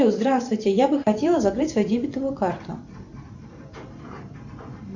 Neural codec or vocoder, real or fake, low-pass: vocoder, 44.1 kHz, 128 mel bands every 512 samples, BigVGAN v2; fake; 7.2 kHz